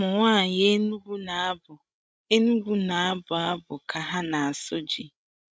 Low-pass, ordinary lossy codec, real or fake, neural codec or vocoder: none; none; fake; codec, 16 kHz, 16 kbps, FreqCodec, larger model